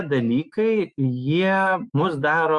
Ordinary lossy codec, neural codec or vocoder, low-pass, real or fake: Opus, 64 kbps; autoencoder, 48 kHz, 128 numbers a frame, DAC-VAE, trained on Japanese speech; 10.8 kHz; fake